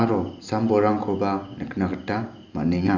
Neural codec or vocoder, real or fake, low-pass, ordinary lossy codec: none; real; 7.2 kHz; none